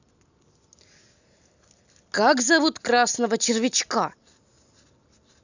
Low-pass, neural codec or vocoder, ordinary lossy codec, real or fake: 7.2 kHz; none; none; real